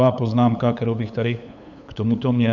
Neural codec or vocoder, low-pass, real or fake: codec, 16 kHz, 8 kbps, FunCodec, trained on LibriTTS, 25 frames a second; 7.2 kHz; fake